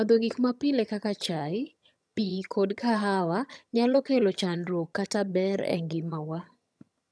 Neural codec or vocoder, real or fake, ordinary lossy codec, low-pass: vocoder, 22.05 kHz, 80 mel bands, HiFi-GAN; fake; none; none